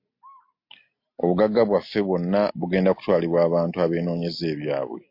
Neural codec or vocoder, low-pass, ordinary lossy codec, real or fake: none; 5.4 kHz; MP3, 32 kbps; real